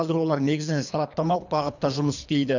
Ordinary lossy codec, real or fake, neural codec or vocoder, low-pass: none; fake; codec, 24 kHz, 3 kbps, HILCodec; 7.2 kHz